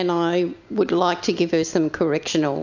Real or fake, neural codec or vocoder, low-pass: real; none; 7.2 kHz